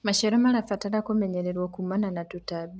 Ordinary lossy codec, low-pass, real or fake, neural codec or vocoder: none; none; real; none